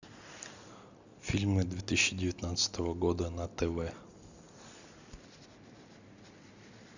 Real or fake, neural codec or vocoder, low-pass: real; none; 7.2 kHz